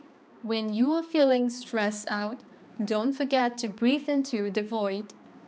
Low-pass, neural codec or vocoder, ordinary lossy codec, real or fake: none; codec, 16 kHz, 2 kbps, X-Codec, HuBERT features, trained on balanced general audio; none; fake